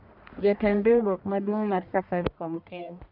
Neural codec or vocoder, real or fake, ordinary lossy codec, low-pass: codec, 44.1 kHz, 1.7 kbps, Pupu-Codec; fake; none; 5.4 kHz